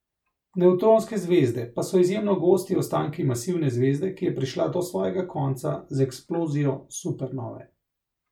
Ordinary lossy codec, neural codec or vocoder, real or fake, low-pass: MP3, 96 kbps; none; real; 19.8 kHz